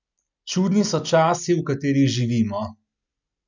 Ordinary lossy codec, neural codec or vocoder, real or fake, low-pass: none; none; real; 7.2 kHz